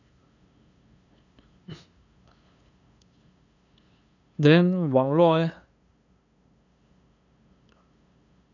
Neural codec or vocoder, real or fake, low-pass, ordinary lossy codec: codec, 16 kHz, 2 kbps, FunCodec, trained on LibriTTS, 25 frames a second; fake; 7.2 kHz; none